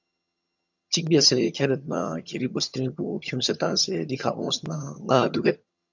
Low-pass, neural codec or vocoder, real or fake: 7.2 kHz; vocoder, 22.05 kHz, 80 mel bands, HiFi-GAN; fake